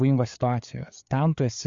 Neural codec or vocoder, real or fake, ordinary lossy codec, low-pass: codec, 16 kHz, 4 kbps, FreqCodec, larger model; fake; Opus, 64 kbps; 7.2 kHz